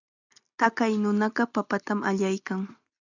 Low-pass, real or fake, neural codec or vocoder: 7.2 kHz; real; none